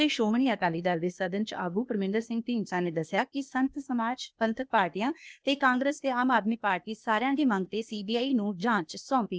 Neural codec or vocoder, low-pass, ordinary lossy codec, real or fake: codec, 16 kHz, 0.8 kbps, ZipCodec; none; none; fake